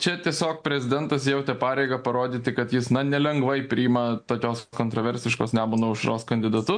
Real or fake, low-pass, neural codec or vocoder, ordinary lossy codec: real; 9.9 kHz; none; MP3, 64 kbps